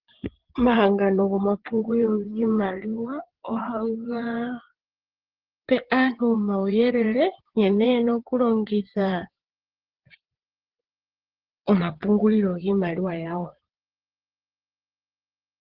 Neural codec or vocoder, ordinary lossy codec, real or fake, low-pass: vocoder, 22.05 kHz, 80 mel bands, WaveNeXt; Opus, 16 kbps; fake; 5.4 kHz